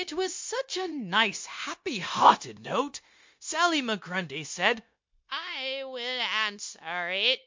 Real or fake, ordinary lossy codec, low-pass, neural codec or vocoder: fake; MP3, 48 kbps; 7.2 kHz; codec, 16 kHz, 0.9 kbps, LongCat-Audio-Codec